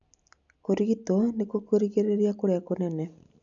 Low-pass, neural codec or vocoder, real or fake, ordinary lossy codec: 7.2 kHz; none; real; none